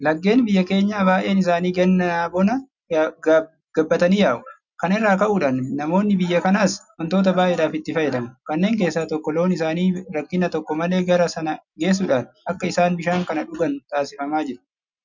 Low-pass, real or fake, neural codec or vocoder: 7.2 kHz; real; none